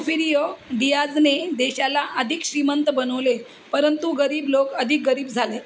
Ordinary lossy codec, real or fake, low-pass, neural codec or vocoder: none; real; none; none